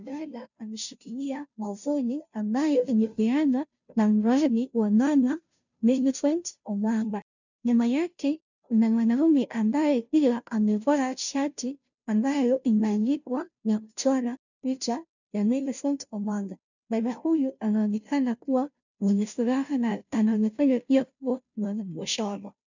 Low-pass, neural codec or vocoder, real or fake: 7.2 kHz; codec, 16 kHz, 0.5 kbps, FunCodec, trained on Chinese and English, 25 frames a second; fake